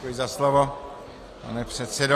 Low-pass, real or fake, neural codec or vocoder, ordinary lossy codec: 14.4 kHz; real; none; AAC, 48 kbps